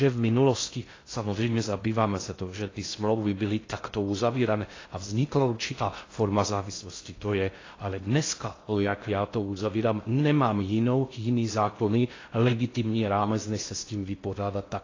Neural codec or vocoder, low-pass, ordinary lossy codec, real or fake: codec, 16 kHz in and 24 kHz out, 0.6 kbps, FocalCodec, streaming, 2048 codes; 7.2 kHz; AAC, 32 kbps; fake